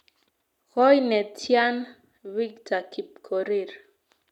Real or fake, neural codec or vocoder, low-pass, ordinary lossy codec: real; none; 19.8 kHz; none